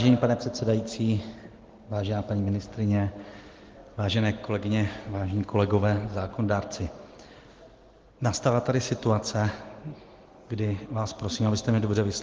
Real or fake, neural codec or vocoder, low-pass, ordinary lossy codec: real; none; 7.2 kHz; Opus, 16 kbps